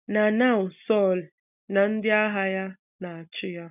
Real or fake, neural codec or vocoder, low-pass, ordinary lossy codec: real; none; 3.6 kHz; none